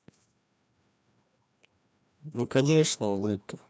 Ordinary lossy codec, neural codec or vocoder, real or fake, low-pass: none; codec, 16 kHz, 1 kbps, FreqCodec, larger model; fake; none